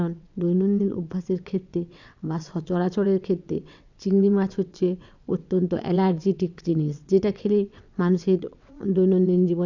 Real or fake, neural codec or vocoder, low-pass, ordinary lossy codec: fake; vocoder, 22.05 kHz, 80 mel bands, WaveNeXt; 7.2 kHz; none